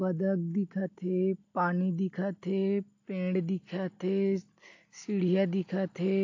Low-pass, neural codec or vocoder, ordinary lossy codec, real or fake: 7.2 kHz; none; AAC, 48 kbps; real